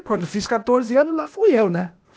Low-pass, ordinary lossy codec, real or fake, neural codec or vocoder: none; none; fake; codec, 16 kHz, 0.8 kbps, ZipCodec